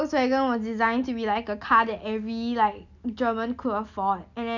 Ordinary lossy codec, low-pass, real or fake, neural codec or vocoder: none; 7.2 kHz; real; none